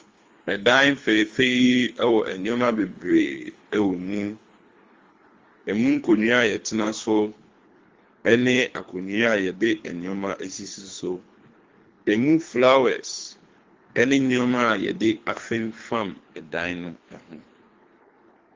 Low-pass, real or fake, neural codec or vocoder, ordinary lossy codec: 7.2 kHz; fake; codec, 24 kHz, 3 kbps, HILCodec; Opus, 32 kbps